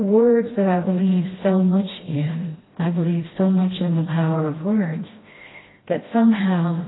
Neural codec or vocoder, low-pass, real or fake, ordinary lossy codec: codec, 16 kHz, 1 kbps, FreqCodec, smaller model; 7.2 kHz; fake; AAC, 16 kbps